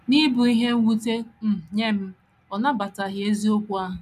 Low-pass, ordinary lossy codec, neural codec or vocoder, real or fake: 14.4 kHz; none; none; real